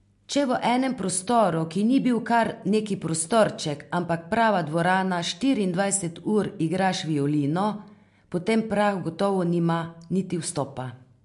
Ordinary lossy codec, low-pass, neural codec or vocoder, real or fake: MP3, 64 kbps; 10.8 kHz; none; real